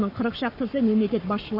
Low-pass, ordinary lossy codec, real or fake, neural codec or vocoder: 5.4 kHz; none; fake; codec, 44.1 kHz, 7.8 kbps, Pupu-Codec